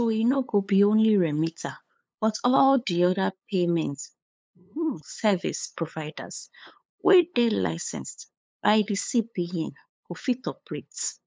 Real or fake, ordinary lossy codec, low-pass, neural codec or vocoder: fake; none; none; codec, 16 kHz, 8 kbps, FunCodec, trained on LibriTTS, 25 frames a second